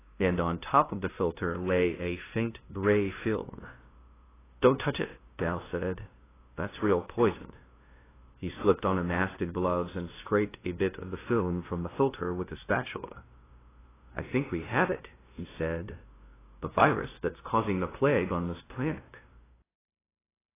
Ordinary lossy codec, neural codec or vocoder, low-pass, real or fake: AAC, 16 kbps; codec, 16 kHz, 0.5 kbps, FunCodec, trained on LibriTTS, 25 frames a second; 3.6 kHz; fake